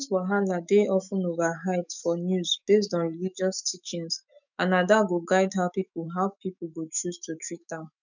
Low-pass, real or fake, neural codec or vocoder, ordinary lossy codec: 7.2 kHz; fake; autoencoder, 48 kHz, 128 numbers a frame, DAC-VAE, trained on Japanese speech; none